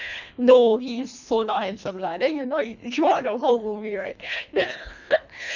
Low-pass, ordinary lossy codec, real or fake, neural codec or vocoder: 7.2 kHz; none; fake; codec, 24 kHz, 1.5 kbps, HILCodec